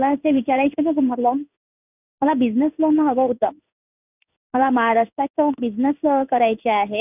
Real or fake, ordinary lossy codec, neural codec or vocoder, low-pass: fake; none; codec, 16 kHz in and 24 kHz out, 1 kbps, XY-Tokenizer; 3.6 kHz